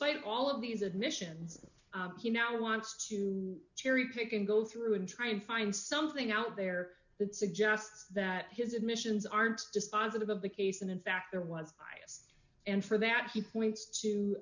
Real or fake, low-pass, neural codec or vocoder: real; 7.2 kHz; none